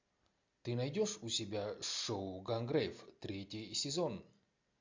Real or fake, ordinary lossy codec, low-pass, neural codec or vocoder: real; MP3, 64 kbps; 7.2 kHz; none